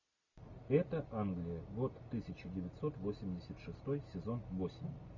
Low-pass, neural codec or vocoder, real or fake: 7.2 kHz; none; real